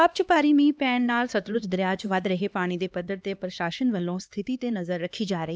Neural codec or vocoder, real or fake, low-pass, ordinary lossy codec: codec, 16 kHz, 2 kbps, X-Codec, HuBERT features, trained on LibriSpeech; fake; none; none